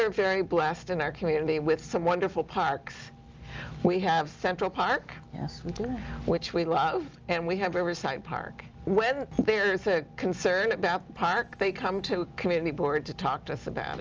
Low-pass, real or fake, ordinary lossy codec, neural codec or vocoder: 7.2 kHz; real; Opus, 24 kbps; none